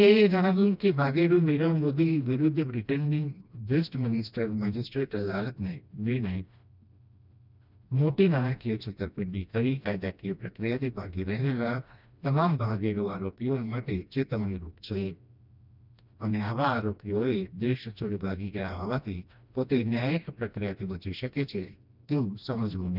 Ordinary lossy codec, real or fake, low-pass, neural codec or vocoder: none; fake; 5.4 kHz; codec, 16 kHz, 1 kbps, FreqCodec, smaller model